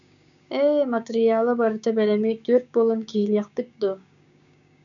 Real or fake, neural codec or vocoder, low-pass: fake; codec, 16 kHz, 6 kbps, DAC; 7.2 kHz